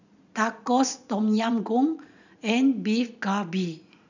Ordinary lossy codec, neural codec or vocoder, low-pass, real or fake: none; vocoder, 44.1 kHz, 80 mel bands, Vocos; 7.2 kHz; fake